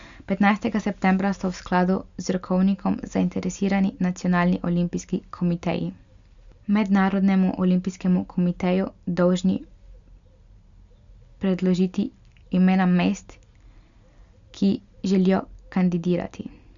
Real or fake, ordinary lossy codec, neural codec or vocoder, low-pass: real; Opus, 64 kbps; none; 7.2 kHz